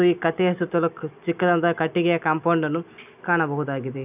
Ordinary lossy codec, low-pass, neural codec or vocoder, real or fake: none; 3.6 kHz; none; real